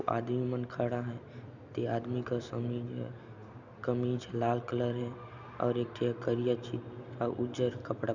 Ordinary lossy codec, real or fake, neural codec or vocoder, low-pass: none; real; none; 7.2 kHz